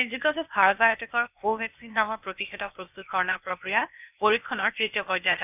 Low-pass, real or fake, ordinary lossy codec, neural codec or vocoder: 3.6 kHz; fake; none; codec, 16 kHz, 0.8 kbps, ZipCodec